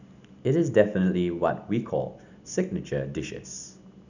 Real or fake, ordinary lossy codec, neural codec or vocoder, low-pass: real; none; none; 7.2 kHz